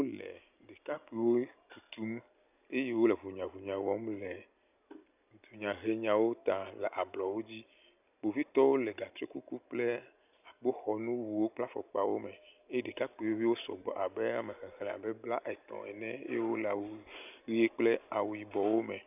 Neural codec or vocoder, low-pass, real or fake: none; 3.6 kHz; real